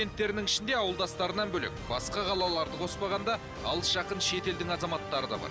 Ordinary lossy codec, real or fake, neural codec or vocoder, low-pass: none; real; none; none